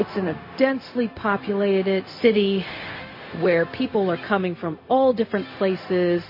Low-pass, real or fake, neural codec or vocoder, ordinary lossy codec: 5.4 kHz; fake; codec, 16 kHz, 0.4 kbps, LongCat-Audio-Codec; MP3, 24 kbps